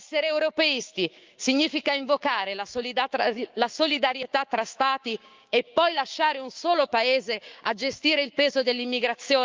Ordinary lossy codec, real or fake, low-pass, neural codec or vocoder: Opus, 32 kbps; fake; 7.2 kHz; codec, 16 kHz, 6 kbps, DAC